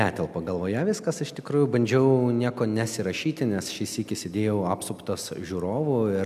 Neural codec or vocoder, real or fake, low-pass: none; real; 14.4 kHz